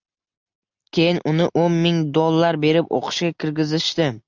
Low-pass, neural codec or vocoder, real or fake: 7.2 kHz; none; real